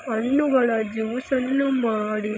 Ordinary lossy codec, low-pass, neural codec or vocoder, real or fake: none; none; codec, 16 kHz, 16 kbps, FreqCodec, larger model; fake